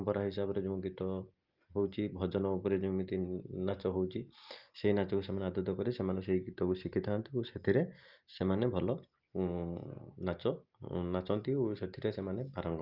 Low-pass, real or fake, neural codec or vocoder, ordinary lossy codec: 5.4 kHz; real; none; Opus, 32 kbps